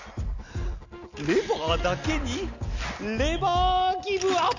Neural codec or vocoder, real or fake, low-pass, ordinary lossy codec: none; real; 7.2 kHz; none